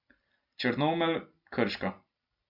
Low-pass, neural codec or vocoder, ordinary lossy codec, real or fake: 5.4 kHz; none; none; real